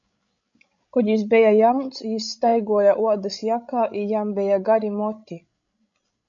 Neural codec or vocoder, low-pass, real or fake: codec, 16 kHz, 16 kbps, FreqCodec, larger model; 7.2 kHz; fake